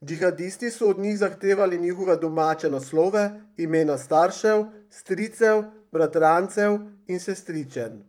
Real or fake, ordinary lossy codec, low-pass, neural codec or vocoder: fake; none; 19.8 kHz; vocoder, 44.1 kHz, 128 mel bands, Pupu-Vocoder